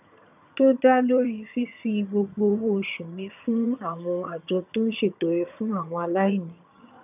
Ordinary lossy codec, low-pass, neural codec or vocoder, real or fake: none; 3.6 kHz; vocoder, 22.05 kHz, 80 mel bands, HiFi-GAN; fake